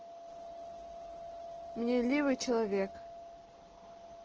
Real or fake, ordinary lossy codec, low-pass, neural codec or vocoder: real; Opus, 16 kbps; 7.2 kHz; none